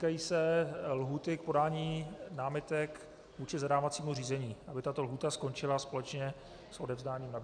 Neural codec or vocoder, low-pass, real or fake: none; 9.9 kHz; real